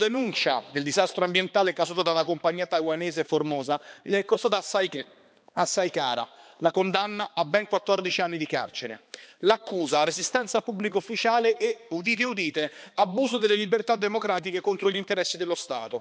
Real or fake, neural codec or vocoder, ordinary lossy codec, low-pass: fake; codec, 16 kHz, 2 kbps, X-Codec, HuBERT features, trained on balanced general audio; none; none